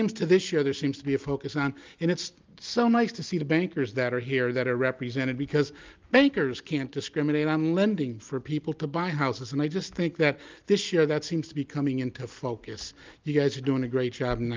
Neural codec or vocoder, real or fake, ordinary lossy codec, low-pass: none; real; Opus, 24 kbps; 7.2 kHz